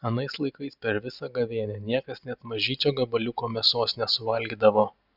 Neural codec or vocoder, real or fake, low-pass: none; real; 5.4 kHz